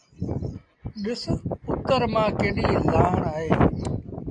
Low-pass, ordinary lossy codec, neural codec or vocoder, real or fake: 9.9 kHz; AAC, 64 kbps; none; real